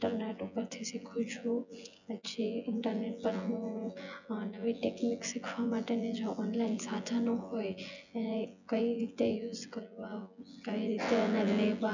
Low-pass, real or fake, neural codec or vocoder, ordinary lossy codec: 7.2 kHz; fake; vocoder, 24 kHz, 100 mel bands, Vocos; none